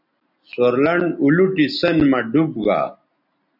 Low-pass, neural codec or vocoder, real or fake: 5.4 kHz; none; real